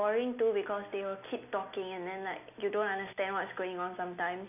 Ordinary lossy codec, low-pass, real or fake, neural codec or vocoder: none; 3.6 kHz; real; none